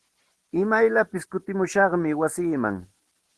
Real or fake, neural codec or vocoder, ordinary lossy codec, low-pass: real; none; Opus, 16 kbps; 10.8 kHz